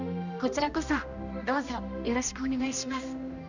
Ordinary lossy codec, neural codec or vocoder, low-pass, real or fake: none; codec, 16 kHz, 1 kbps, X-Codec, HuBERT features, trained on general audio; 7.2 kHz; fake